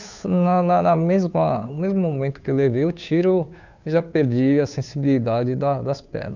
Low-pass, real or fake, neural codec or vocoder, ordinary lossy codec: 7.2 kHz; fake; codec, 16 kHz, 2 kbps, FunCodec, trained on Chinese and English, 25 frames a second; none